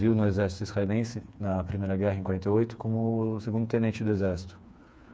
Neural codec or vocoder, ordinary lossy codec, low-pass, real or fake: codec, 16 kHz, 4 kbps, FreqCodec, smaller model; none; none; fake